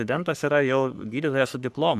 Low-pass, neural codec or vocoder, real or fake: 14.4 kHz; codec, 44.1 kHz, 3.4 kbps, Pupu-Codec; fake